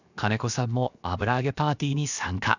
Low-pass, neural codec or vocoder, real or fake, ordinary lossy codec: 7.2 kHz; codec, 16 kHz, 0.7 kbps, FocalCodec; fake; none